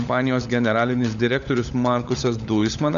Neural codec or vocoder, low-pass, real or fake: codec, 16 kHz, 16 kbps, FunCodec, trained on LibriTTS, 50 frames a second; 7.2 kHz; fake